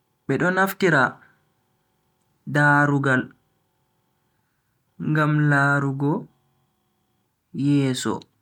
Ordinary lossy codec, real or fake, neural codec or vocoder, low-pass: none; real; none; 19.8 kHz